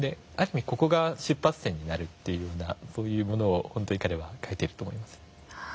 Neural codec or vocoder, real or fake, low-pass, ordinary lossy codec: none; real; none; none